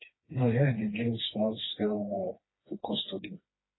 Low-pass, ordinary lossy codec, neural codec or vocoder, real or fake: 7.2 kHz; AAC, 16 kbps; codec, 16 kHz, 2 kbps, FreqCodec, smaller model; fake